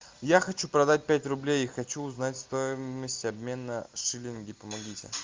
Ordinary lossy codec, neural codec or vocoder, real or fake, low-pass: Opus, 32 kbps; none; real; 7.2 kHz